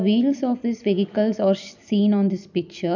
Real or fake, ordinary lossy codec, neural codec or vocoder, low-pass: fake; none; vocoder, 44.1 kHz, 128 mel bands every 256 samples, BigVGAN v2; 7.2 kHz